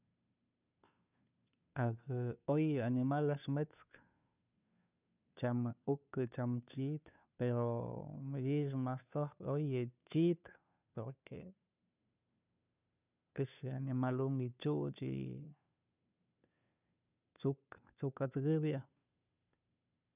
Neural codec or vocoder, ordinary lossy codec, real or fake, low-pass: codec, 16 kHz, 2 kbps, FunCodec, trained on Chinese and English, 25 frames a second; none; fake; 3.6 kHz